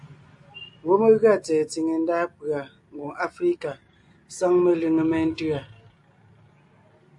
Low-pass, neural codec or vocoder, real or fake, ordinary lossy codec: 10.8 kHz; none; real; AAC, 64 kbps